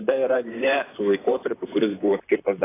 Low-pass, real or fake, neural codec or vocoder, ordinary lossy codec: 3.6 kHz; fake; vocoder, 44.1 kHz, 128 mel bands, Pupu-Vocoder; AAC, 16 kbps